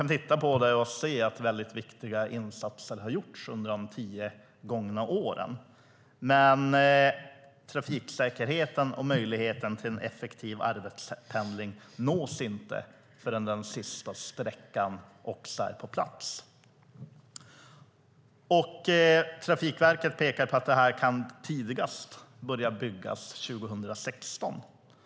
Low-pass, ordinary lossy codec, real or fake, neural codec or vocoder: none; none; real; none